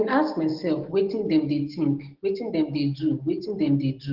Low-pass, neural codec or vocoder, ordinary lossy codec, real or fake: 5.4 kHz; none; Opus, 16 kbps; real